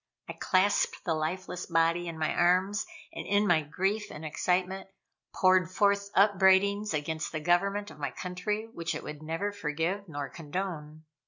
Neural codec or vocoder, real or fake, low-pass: none; real; 7.2 kHz